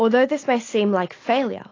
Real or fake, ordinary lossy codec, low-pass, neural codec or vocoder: real; AAC, 32 kbps; 7.2 kHz; none